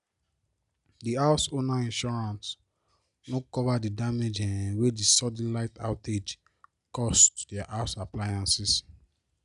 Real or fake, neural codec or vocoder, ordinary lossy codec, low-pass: real; none; none; 10.8 kHz